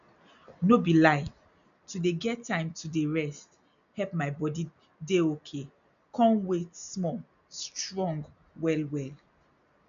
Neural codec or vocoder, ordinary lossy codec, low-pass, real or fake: none; none; 7.2 kHz; real